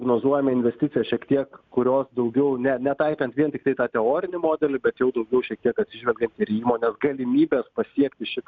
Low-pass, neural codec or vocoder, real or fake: 7.2 kHz; none; real